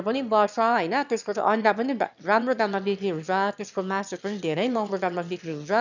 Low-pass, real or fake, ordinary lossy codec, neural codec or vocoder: 7.2 kHz; fake; none; autoencoder, 22.05 kHz, a latent of 192 numbers a frame, VITS, trained on one speaker